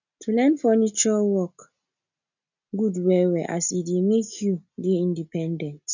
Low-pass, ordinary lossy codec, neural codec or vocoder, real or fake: 7.2 kHz; none; none; real